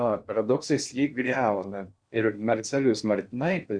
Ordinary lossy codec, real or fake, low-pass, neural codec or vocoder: Opus, 64 kbps; fake; 9.9 kHz; codec, 16 kHz in and 24 kHz out, 0.8 kbps, FocalCodec, streaming, 65536 codes